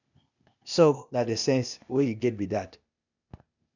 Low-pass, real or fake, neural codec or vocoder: 7.2 kHz; fake; codec, 16 kHz, 0.8 kbps, ZipCodec